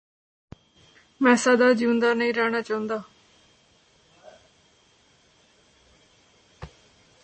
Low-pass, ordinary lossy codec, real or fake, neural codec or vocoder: 9.9 kHz; MP3, 32 kbps; real; none